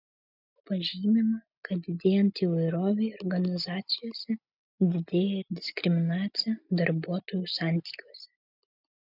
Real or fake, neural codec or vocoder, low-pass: real; none; 5.4 kHz